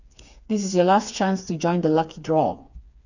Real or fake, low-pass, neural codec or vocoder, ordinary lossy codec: fake; 7.2 kHz; codec, 16 kHz, 4 kbps, FreqCodec, smaller model; none